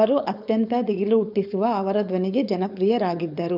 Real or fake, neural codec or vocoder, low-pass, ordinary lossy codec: fake; codec, 16 kHz, 4.8 kbps, FACodec; 5.4 kHz; none